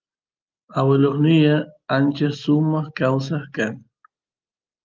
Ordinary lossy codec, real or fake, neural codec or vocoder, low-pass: Opus, 32 kbps; real; none; 7.2 kHz